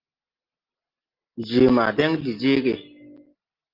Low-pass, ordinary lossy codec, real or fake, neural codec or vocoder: 5.4 kHz; Opus, 24 kbps; real; none